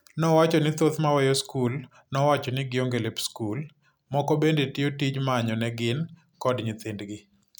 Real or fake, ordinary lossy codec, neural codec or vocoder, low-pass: real; none; none; none